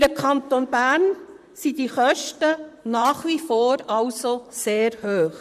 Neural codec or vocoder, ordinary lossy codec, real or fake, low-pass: vocoder, 44.1 kHz, 128 mel bands, Pupu-Vocoder; none; fake; 14.4 kHz